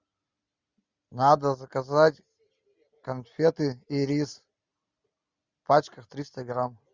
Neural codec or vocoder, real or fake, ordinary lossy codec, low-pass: none; real; Opus, 64 kbps; 7.2 kHz